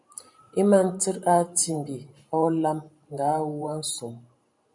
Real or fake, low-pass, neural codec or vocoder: fake; 10.8 kHz; vocoder, 44.1 kHz, 128 mel bands every 512 samples, BigVGAN v2